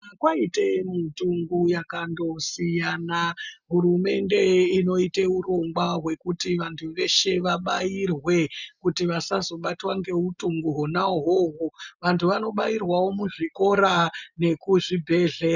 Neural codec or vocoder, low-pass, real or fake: none; 7.2 kHz; real